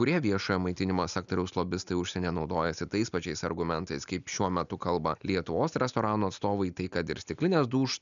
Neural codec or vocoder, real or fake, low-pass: none; real; 7.2 kHz